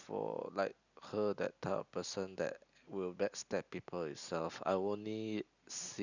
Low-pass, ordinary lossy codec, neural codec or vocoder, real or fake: 7.2 kHz; none; none; real